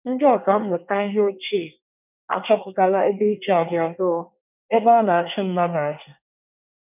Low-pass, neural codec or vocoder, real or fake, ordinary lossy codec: 3.6 kHz; codec, 24 kHz, 1 kbps, SNAC; fake; none